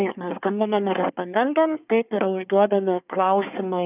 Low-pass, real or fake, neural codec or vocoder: 3.6 kHz; fake; codec, 24 kHz, 1 kbps, SNAC